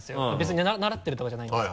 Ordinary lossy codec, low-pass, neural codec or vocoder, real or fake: none; none; none; real